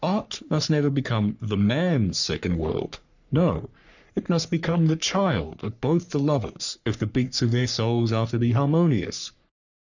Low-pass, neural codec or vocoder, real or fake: 7.2 kHz; codec, 44.1 kHz, 3.4 kbps, Pupu-Codec; fake